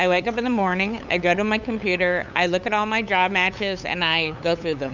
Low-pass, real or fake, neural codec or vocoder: 7.2 kHz; fake; codec, 16 kHz, 8 kbps, FunCodec, trained on LibriTTS, 25 frames a second